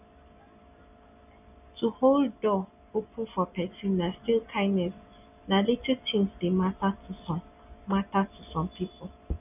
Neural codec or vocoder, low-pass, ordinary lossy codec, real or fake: none; 3.6 kHz; none; real